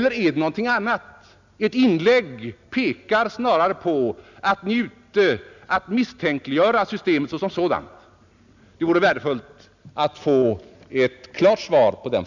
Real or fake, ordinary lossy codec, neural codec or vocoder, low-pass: real; none; none; 7.2 kHz